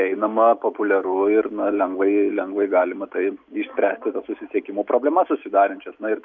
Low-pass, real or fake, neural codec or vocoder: 7.2 kHz; real; none